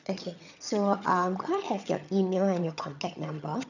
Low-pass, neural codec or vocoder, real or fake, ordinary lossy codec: 7.2 kHz; vocoder, 22.05 kHz, 80 mel bands, HiFi-GAN; fake; none